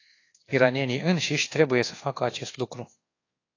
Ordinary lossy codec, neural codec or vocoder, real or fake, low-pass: AAC, 32 kbps; codec, 24 kHz, 1.2 kbps, DualCodec; fake; 7.2 kHz